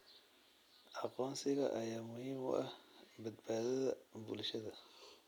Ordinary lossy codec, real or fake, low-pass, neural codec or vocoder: none; real; 19.8 kHz; none